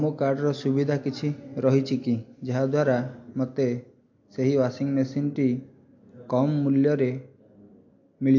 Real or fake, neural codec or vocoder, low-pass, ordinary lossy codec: real; none; 7.2 kHz; MP3, 48 kbps